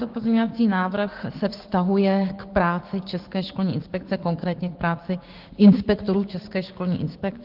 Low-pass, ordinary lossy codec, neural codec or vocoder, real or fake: 5.4 kHz; Opus, 16 kbps; codec, 16 kHz, 6 kbps, DAC; fake